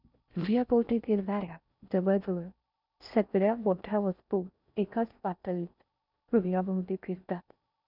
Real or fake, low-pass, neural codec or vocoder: fake; 5.4 kHz; codec, 16 kHz in and 24 kHz out, 0.6 kbps, FocalCodec, streaming, 4096 codes